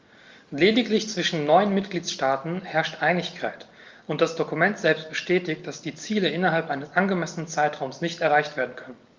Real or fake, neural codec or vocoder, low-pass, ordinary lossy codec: real; none; 7.2 kHz; Opus, 32 kbps